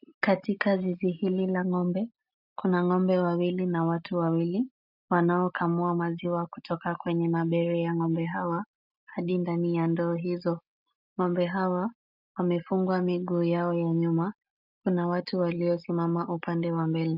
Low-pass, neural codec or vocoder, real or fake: 5.4 kHz; none; real